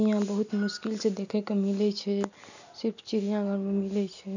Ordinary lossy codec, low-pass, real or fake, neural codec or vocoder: none; 7.2 kHz; real; none